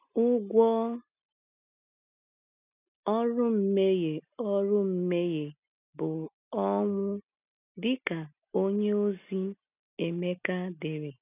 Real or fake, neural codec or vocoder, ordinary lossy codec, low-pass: real; none; none; 3.6 kHz